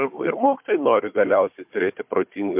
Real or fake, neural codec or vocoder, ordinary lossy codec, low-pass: fake; codec, 16 kHz, 4 kbps, FunCodec, trained on Chinese and English, 50 frames a second; MP3, 32 kbps; 3.6 kHz